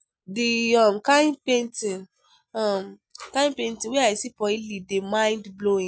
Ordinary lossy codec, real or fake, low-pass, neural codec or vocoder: none; real; none; none